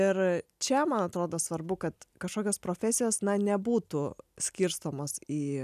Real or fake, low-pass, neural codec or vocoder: real; 14.4 kHz; none